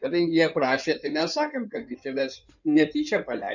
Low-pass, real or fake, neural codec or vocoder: 7.2 kHz; fake; codec, 16 kHz in and 24 kHz out, 2.2 kbps, FireRedTTS-2 codec